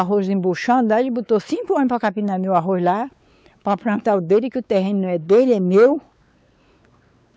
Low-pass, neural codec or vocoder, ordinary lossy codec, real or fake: none; codec, 16 kHz, 4 kbps, X-Codec, WavLM features, trained on Multilingual LibriSpeech; none; fake